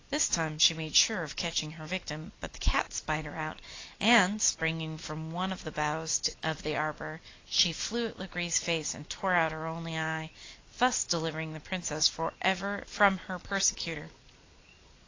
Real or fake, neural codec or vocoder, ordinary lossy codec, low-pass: real; none; AAC, 32 kbps; 7.2 kHz